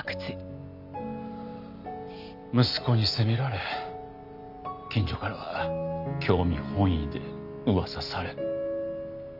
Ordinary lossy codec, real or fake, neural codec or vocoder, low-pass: none; real; none; 5.4 kHz